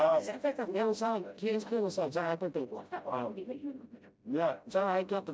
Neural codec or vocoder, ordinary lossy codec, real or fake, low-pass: codec, 16 kHz, 0.5 kbps, FreqCodec, smaller model; none; fake; none